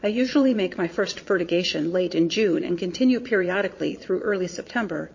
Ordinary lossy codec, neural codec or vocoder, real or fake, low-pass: MP3, 32 kbps; vocoder, 22.05 kHz, 80 mel bands, Vocos; fake; 7.2 kHz